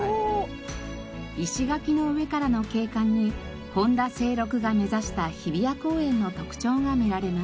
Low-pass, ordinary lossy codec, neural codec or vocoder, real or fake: none; none; none; real